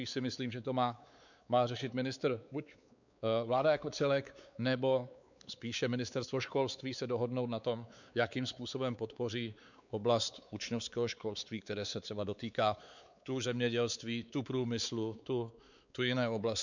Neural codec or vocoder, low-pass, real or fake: codec, 16 kHz, 4 kbps, X-Codec, WavLM features, trained on Multilingual LibriSpeech; 7.2 kHz; fake